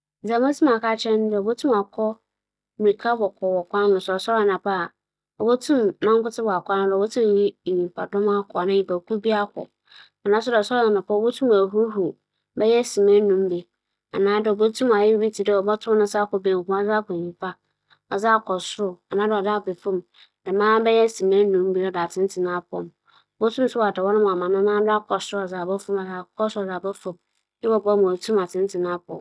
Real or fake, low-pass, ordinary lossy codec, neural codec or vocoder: real; none; none; none